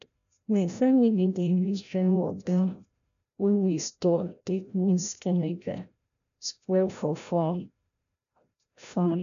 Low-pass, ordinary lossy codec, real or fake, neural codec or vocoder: 7.2 kHz; none; fake; codec, 16 kHz, 0.5 kbps, FreqCodec, larger model